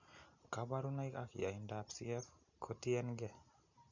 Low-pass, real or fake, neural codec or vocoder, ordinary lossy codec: 7.2 kHz; real; none; none